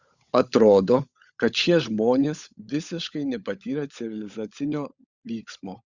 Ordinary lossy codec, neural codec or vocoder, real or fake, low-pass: Opus, 64 kbps; codec, 16 kHz, 16 kbps, FunCodec, trained on LibriTTS, 50 frames a second; fake; 7.2 kHz